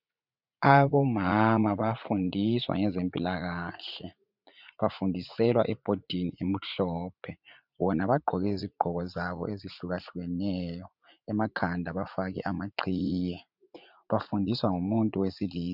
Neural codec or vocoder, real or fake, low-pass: vocoder, 44.1 kHz, 80 mel bands, Vocos; fake; 5.4 kHz